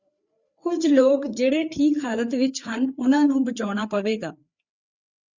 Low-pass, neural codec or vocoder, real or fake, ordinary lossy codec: 7.2 kHz; codec, 16 kHz, 4 kbps, FreqCodec, larger model; fake; Opus, 64 kbps